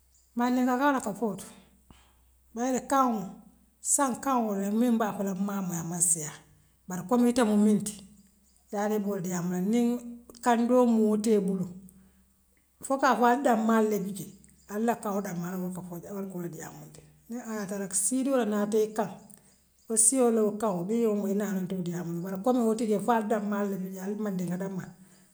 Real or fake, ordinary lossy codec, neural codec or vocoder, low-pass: real; none; none; none